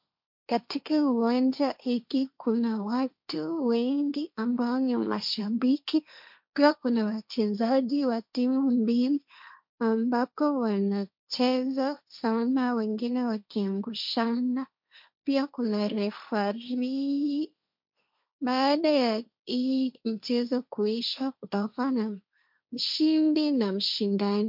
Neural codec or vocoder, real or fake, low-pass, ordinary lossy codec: codec, 16 kHz, 1.1 kbps, Voila-Tokenizer; fake; 5.4 kHz; MP3, 48 kbps